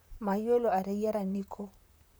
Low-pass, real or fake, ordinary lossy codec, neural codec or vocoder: none; fake; none; vocoder, 44.1 kHz, 128 mel bands, Pupu-Vocoder